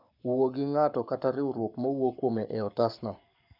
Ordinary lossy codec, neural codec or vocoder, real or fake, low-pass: none; codec, 44.1 kHz, 7.8 kbps, Pupu-Codec; fake; 5.4 kHz